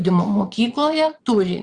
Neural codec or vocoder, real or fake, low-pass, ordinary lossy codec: vocoder, 22.05 kHz, 80 mel bands, Vocos; fake; 9.9 kHz; Opus, 64 kbps